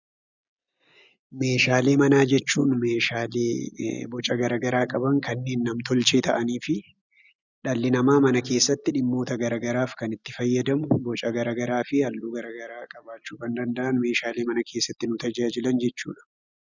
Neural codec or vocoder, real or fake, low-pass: none; real; 7.2 kHz